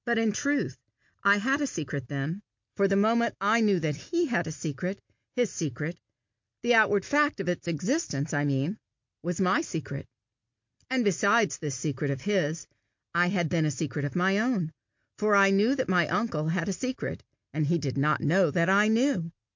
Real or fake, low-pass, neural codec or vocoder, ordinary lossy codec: real; 7.2 kHz; none; MP3, 48 kbps